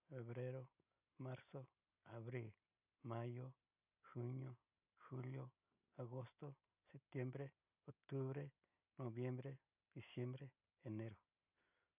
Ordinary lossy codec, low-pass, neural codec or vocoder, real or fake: none; 3.6 kHz; none; real